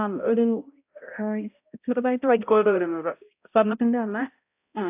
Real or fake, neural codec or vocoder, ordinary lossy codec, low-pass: fake; codec, 16 kHz, 0.5 kbps, X-Codec, HuBERT features, trained on balanced general audio; AAC, 32 kbps; 3.6 kHz